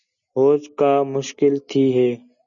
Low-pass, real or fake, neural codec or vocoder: 7.2 kHz; real; none